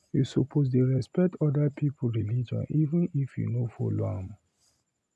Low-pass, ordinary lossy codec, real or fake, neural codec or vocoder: none; none; real; none